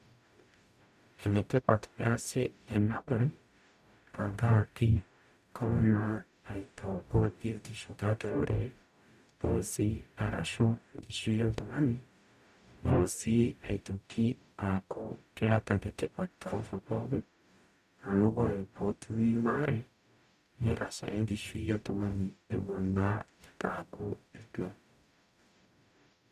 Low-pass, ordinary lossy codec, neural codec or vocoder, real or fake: 14.4 kHz; none; codec, 44.1 kHz, 0.9 kbps, DAC; fake